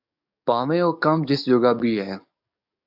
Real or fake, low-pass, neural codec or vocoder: fake; 5.4 kHz; codec, 44.1 kHz, 7.8 kbps, DAC